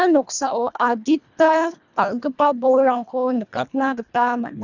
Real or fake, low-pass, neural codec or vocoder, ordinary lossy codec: fake; 7.2 kHz; codec, 24 kHz, 1.5 kbps, HILCodec; none